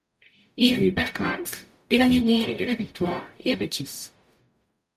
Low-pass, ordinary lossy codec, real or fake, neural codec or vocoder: 14.4 kHz; MP3, 96 kbps; fake; codec, 44.1 kHz, 0.9 kbps, DAC